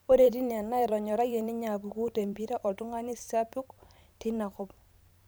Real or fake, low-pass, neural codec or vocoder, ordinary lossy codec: fake; none; vocoder, 44.1 kHz, 128 mel bands every 512 samples, BigVGAN v2; none